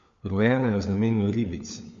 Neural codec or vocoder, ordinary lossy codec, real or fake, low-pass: codec, 16 kHz, 4 kbps, FunCodec, trained on LibriTTS, 50 frames a second; MP3, 48 kbps; fake; 7.2 kHz